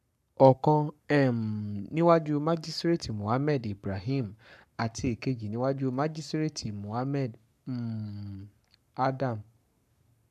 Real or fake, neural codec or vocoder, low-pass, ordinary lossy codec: fake; codec, 44.1 kHz, 7.8 kbps, Pupu-Codec; 14.4 kHz; none